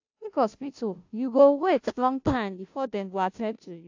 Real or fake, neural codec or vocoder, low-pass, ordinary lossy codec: fake; codec, 16 kHz, 0.5 kbps, FunCodec, trained on Chinese and English, 25 frames a second; 7.2 kHz; AAC, 48 kbps